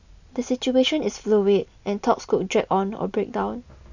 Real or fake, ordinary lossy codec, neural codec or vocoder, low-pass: real; none; none; 7.2 kHz